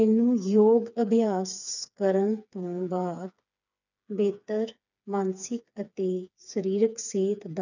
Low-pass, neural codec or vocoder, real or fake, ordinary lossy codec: 7.2 kHz; codec, 16 kHz, 4 kbps, FreqCodec, smaller model; fake; none